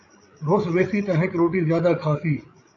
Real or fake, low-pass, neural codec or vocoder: fake; 7.2 kHz; codec, 16 kHz, 8 kbps, FreqCodec, larger model